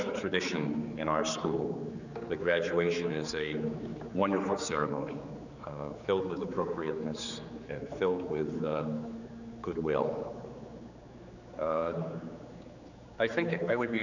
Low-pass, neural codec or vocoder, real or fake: 7.2 kHz; codec, 16 kHz, 4 kbps, X-Codec, HuBERT features, trained on general audio; fake